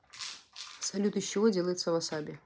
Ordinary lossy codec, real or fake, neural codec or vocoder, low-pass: none; real; none; none